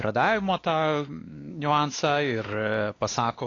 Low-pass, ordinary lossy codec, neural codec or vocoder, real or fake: 7.2 kHz; AAC, 32 kbps; codec, 16 kHz, 2 kbps, X-Codec, WavLM features, trained on Multilingual LibriSpeech; fake